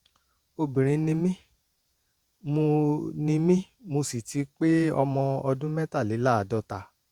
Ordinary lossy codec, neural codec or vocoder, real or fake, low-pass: Opus, 64 kbps; vocoder, 48 kHz, 128 mel bands, Vocos; fake; 19.8 kHz